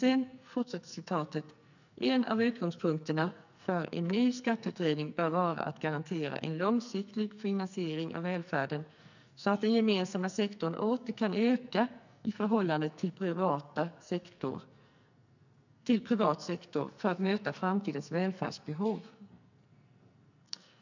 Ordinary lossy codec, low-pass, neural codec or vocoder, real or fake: none; 7.2 kHz; codec, 44.1 kHz, 2.6 kbps, SNAC; fake